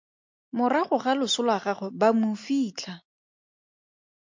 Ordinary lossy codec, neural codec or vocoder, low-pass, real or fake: MP3, 48 kbps; none; 7.2 kHz; real